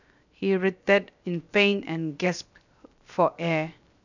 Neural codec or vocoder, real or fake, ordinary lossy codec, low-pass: codec, 16 kHz, 0.7 kbps, FocalCodec; fake; none; 7.2 kHz